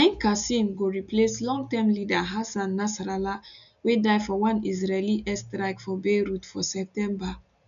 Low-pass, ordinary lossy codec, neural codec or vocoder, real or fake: 7.2 kHz; none; none; real